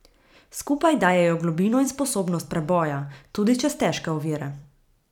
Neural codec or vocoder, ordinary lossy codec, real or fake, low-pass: vocoder, 44.1 kHz, 128 mel bands every 256 samples, BigVGAN v2; none; fake; 19.8 kHz